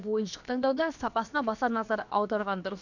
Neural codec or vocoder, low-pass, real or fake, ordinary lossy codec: codec, 16 kHz, about 1 kbps, DyCAST, with the encoder's durations; 7.2 kHz; fake; none